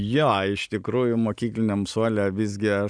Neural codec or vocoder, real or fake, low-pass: none; real; 9.9 kHz